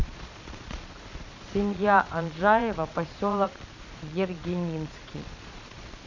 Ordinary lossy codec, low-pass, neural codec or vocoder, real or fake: none; 7.2 kHz; vocoder, 22.05 kHz, 80 mel bands, Vocos; fake